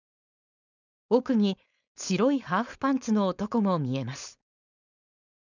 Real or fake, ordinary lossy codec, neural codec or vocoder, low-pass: fake; none; codec, 16 kHz, 4.8 kbps, FACodec; 7.2 kHz